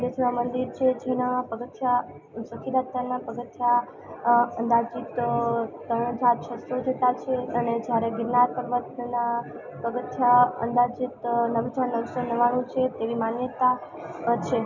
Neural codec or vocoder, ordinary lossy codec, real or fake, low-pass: none; none; real; 7.2 kHz